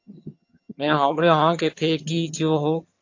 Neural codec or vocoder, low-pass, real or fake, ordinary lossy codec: vocoder, 22.05 kHz, 80 mel bands, HiFi-GAN; 7.2 kHz; fake; AAC, 48 kbps